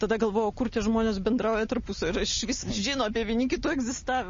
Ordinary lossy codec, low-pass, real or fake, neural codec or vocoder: MP3, 32 kbps; 7.2 kHz; real; none